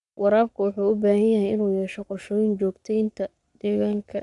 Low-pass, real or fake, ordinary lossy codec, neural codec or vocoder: 10.8 kHz; fake; none; codec, 44.1 kHz, 7.8 kbps, Pupu-Codec